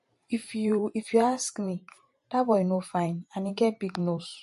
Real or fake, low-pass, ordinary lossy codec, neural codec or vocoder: fake; 14.4 kHz; MP3, 48 kbps; vocoder, 44.1 kHz, 128 mel bands every 512 samples, BigVGAN v2